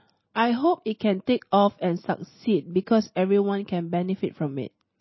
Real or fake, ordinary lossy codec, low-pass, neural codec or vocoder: real; MP3, 24 kbps; 7.2 kHz; none